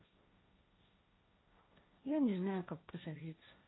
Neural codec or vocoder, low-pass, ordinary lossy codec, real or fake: codec, 16 kHz, 1.1 kbps, Voila-Tokenizer; 7.2 kHz; AAC, 16 kbps; fake